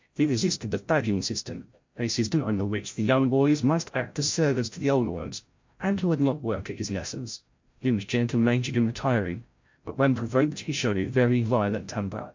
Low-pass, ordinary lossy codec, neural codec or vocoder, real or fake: 7.2 kHz; MP3, 48 kbps; codec, 16 kHz, 0.5 kbps, FreqCodec, larger model; fake